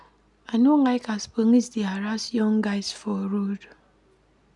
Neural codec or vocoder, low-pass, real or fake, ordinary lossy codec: none; 10.8 kHz; real; none